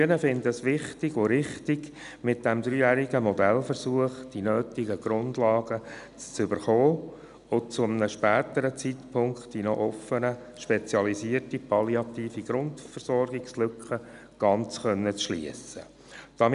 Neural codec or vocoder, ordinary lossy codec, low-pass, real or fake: none; none; 10.8 kHz; real